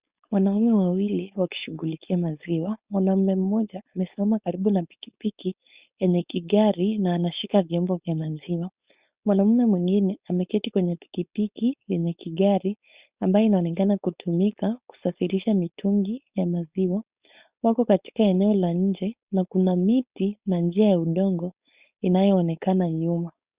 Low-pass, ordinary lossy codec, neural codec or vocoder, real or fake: 3.6 kHz; Opus, 64 kbps; codec, 16 kHz, 4.8 kbps, FACodec; fake